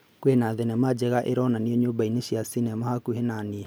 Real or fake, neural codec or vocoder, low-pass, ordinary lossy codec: real; none; none; none